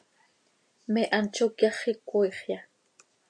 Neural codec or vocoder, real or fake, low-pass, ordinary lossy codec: none; real; 9.9 kHz; MP3, 64 kbps